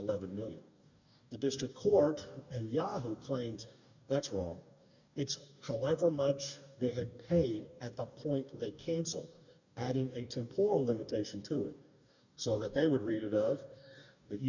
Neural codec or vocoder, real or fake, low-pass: codec, 44.1 kHz, 2.6 kbps, DAC; fake; 7.2 kHz